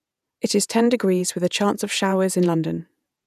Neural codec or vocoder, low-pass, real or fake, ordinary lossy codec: vocoder, 48 kHz, 128 mel bands, Vocos; 14.4 kHz; fake; none